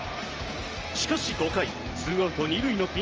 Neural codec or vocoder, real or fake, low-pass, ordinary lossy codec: vocoder, 44.1 kHz, 128 mel bands every 512 samples, BigVGAN v2; fake; 7.2 kHz; Opus, 24 kbps